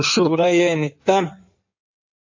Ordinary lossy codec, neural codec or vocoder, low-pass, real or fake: AAC, 48 kbps; codec, 16 kHz in and 24 kHz out, 1.1 kbps, FireRedTTS-2 codec; 7.2 kHz; fake